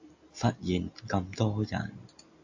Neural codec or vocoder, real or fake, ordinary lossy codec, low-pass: none; real; AAC, 48 kbps; 7.2 kHz